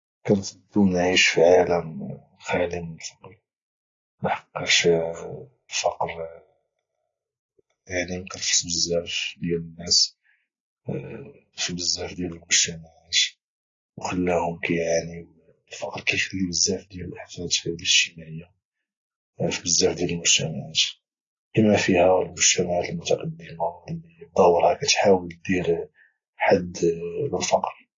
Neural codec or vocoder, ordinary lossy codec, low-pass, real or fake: none; AAC, 32 kbps; 7.2 kHz; real